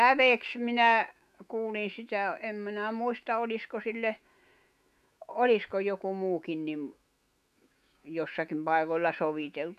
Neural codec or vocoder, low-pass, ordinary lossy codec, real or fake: autoencoder, 48 kHz, 128 numbers a frame, DAC-VAE, trained on Japanese speech; 14.4 kHz; none; fake